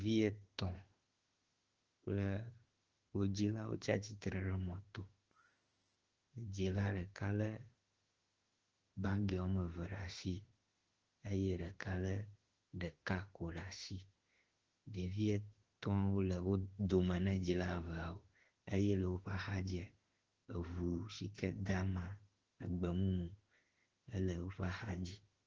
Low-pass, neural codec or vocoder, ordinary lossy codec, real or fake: 7.2 kHz; autoencoder, 48 kHz, 32 numbers a frame, DAC-VAE, trained on Japanese speech; Opus, 16 kbps; fake